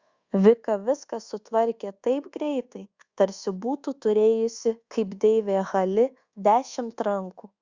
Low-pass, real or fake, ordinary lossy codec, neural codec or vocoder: 7.2 kHz; fake; Opus, 64 kbps; codec, 24 kHz, 1.2 kbps, DualCodec